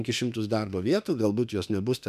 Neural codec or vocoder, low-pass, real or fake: autoencoder, 48 kHz, 32 numbers a frame, DAC-VAE, trained on Japanese speech; 14.4 kHz; fake